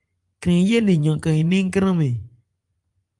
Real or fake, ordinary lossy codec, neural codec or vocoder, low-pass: fake; Opus, 32 kbps; vocoder, 24 kHz, 100 mel bands, Vocos; 10.8 kHz